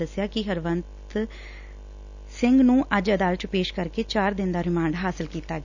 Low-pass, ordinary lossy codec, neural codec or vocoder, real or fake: 7.2 kHz; none; none; real